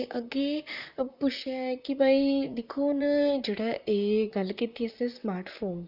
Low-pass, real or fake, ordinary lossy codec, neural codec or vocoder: 5.4 kHz; fake; none; vocoder, 44.1 kHz, 128 mel bands, Pupu-Vocoder